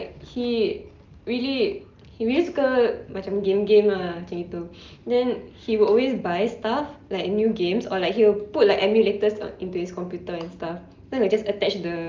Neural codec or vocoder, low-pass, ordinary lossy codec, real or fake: none; 7.2 kHz; Opus, 24 kbps; real